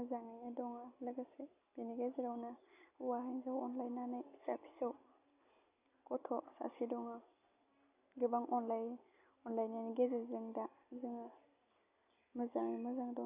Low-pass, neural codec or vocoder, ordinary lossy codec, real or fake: 3.6 kHz; none; none; real